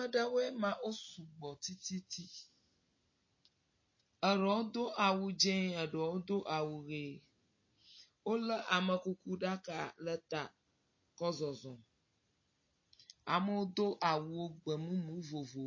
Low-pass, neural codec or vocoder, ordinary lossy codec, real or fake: 7.2 kHz; none; MP3, 32 kbps; real